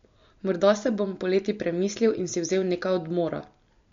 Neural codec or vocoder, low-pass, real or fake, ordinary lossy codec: none; 7.2 kHz; real; MP3, 48 kbps